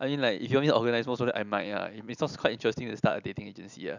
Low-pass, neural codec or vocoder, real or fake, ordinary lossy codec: 7.2 kHz; none; real; none